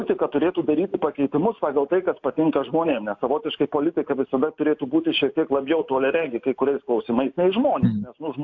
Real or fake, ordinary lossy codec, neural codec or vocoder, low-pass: real; AAC, 48 kbps; none; 7.2 kHz